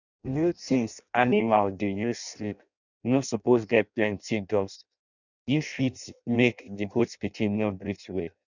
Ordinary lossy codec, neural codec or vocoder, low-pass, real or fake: none; codec, 16 kHz in and 24 kHz out, 0.6 kbps, FireRedTTS-2 codec; 7.2 kHz; fake